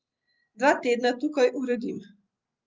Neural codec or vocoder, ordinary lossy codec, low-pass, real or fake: none; Opus, 24 kbps; 7.2 kHz; real